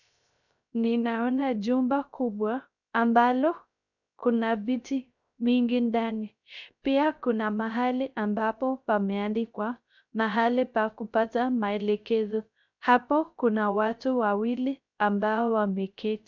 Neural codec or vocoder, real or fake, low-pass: codec, 16 kHz, 0.3 kbps, FocalCodec; fake; 7.2 kHz